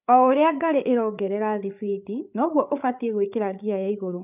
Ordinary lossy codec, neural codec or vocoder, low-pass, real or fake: none; codec, 16 kHz, 8 kbps, FreqCodec, larger model; 3.6 kHz; fake